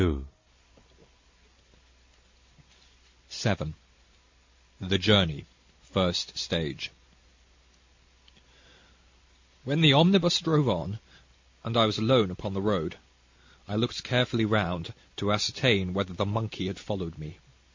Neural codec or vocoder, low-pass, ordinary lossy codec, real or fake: none; 7.2 kHz; MP3, 48 kbps; real